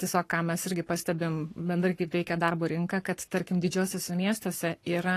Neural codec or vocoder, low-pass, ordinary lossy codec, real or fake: codec, 44.1 kHz, 7.8 kbps, Pupu-Codec; 14.4 kHz; AAC, 48 kbps; fake